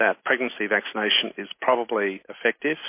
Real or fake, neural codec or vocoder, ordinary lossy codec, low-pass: real; none; MP3, 24 kbps; 3.6 kHz